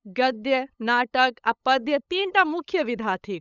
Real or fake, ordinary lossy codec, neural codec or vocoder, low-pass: fake; none; codec, 16 kHz, 8 kbps, FunCodec, trained on LibriTTS, 25 frames a second; 7.2 kHz